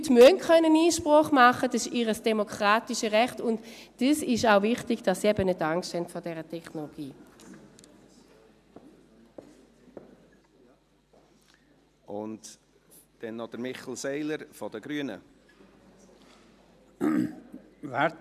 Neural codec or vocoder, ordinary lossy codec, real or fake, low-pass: none; none; real; 14.4 kHz